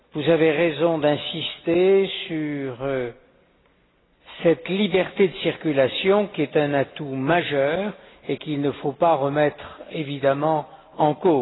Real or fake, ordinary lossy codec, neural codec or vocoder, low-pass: real; AAC, 16 kbps; none; 7.2 kHz